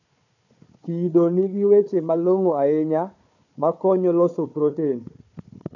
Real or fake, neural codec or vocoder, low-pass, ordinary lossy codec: fake; codec, 16 kHz, 4 kbps, FunCodec, trained on Chinese and English, 50 frames a second; 7.2 kHz; none